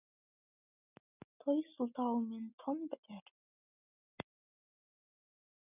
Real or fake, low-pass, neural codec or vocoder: real; 3.6 kHz; none